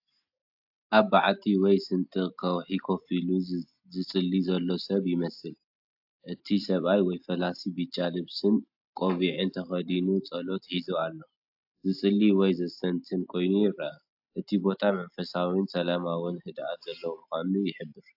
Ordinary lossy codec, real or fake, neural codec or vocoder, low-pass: AAC, 48 kbps; real; none; 5.4 kHz